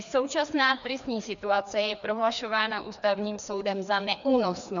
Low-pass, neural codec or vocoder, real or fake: 7.2 kHz; codec, 16 kHz, 2 kbps, FreqCodec, larger model; fake